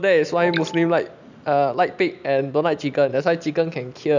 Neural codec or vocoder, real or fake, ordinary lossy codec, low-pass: none; real; none; 7.2 kHz